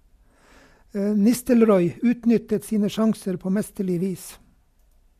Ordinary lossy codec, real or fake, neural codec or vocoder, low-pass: MP3, 64 kbps; real; none; 14.4 kHz